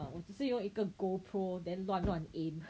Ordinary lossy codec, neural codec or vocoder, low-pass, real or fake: none; none; none; real